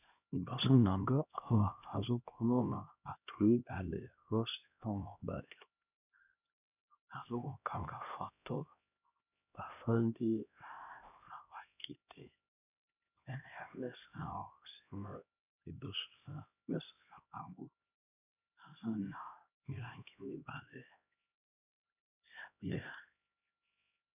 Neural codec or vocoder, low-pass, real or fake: codec, 16 kHz, 1 kbps, X-Codec, HuBERT features, trained on LibriSpeech; 3.6 kHz; fake